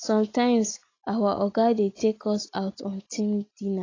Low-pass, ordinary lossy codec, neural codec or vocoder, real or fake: 7.2 kHz; AAC, 32 kbps; none; real